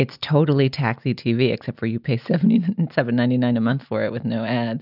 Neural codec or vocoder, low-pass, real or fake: none; 5.4 kHz; real